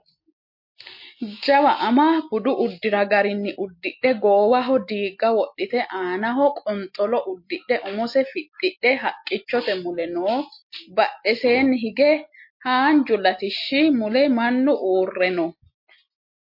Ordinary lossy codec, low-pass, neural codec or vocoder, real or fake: MP3, 32 kbps; 5.4 kHz; none; real